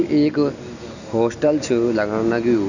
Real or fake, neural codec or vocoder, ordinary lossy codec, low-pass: real; none; none; 7.2 kHz